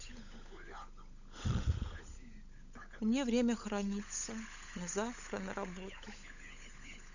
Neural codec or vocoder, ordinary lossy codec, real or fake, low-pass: codec, 16 kHz, 16 kbps, FunCodec, trained on LibriTTS, 50 frames a second; none; fake; 7.2 kHz